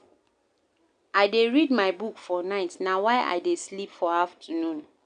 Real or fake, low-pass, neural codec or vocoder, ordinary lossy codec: real; 9.9 kHz; none; none